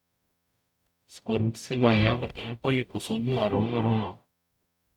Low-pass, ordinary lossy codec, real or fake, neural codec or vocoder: 19.8 kHz; none; fake; codec, 44.1 kHz, 0.9 kbps, DAC